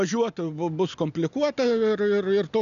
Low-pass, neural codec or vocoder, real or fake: 7.2 kHz; none; real